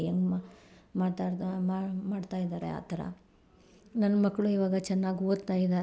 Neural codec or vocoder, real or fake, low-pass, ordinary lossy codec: none; real; none; none